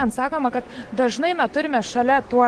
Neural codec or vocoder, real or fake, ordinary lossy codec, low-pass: codec, 44.1 kHz, 7.8 kbps, DAC; fake; Opus, 16 kbps; 10.8 kHz